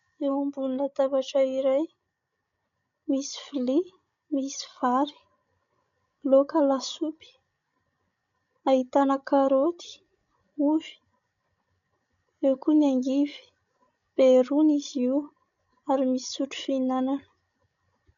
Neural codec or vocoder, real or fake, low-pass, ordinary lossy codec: codec, 16 kHz, 16 kbps, FreqCodec, larger model; fake; 7.2 kHz; MP3, 96 kbps